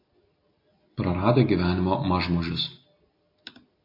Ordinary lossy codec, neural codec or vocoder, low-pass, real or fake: MP3, 32 kbps; none; 5.4 kHz; real